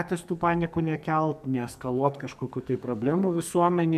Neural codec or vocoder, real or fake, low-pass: codec, 44.1 kHz, 2.6 kbps, SNAC; fake; 14.4 kHz